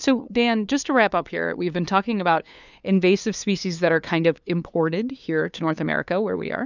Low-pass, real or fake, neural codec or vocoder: 7.2 kHz; fake; codec, 16 kHz, 2 kbps, FunCodec, trained on LibriTTS, 25 frames a second